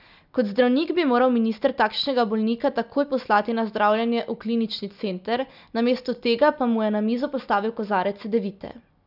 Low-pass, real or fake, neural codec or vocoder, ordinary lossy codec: 5.4 kHz; real; none; none